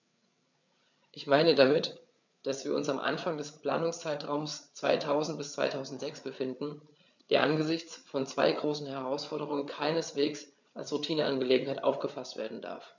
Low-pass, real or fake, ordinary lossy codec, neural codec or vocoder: 7.2 kHz; fake; none; codec, 16 kHz, 8 kbps, FreqCodec, larger model